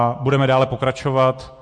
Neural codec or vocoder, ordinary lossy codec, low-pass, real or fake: none; MP3, 48 kbps; 9.9 kHz; real